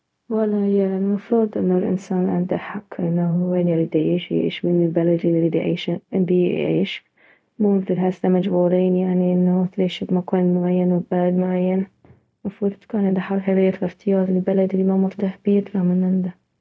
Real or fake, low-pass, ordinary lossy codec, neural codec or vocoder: fake; none; none; codec, 16 kHz, 0.4 kbps, LongCat-Audio-Codec